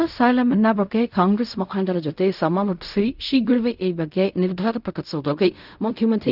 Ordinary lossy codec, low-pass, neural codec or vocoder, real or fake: none; 5.4 kHz; codec, 16 kHz in and 24 kHz out, 0.4 kbps, LongCat-Audio-Codec, fine tuned four codebook decoder; fake